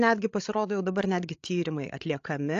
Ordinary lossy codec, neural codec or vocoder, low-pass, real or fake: AAC, 48 kbps; codec, 16 kHz, 16 kbps, FreqCodec, larger model; 7.2 kHz; fake